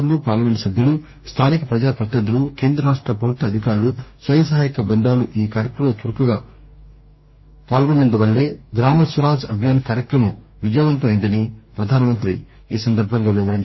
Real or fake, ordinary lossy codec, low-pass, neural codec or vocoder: fake; MP3, 24 kbps; 7.2 kHz; codec, 44.1 kHz, 2.6 kbps, DAC